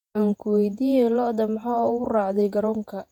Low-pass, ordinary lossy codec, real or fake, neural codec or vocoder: 19.8 kHz; none; fake; vocoder, 48 kHz, 128 mel bands, Vocos